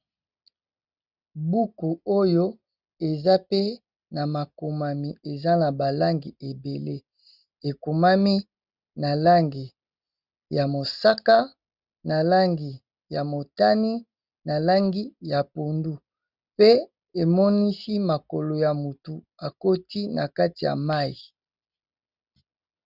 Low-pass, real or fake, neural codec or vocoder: 5.4 kHz; real; none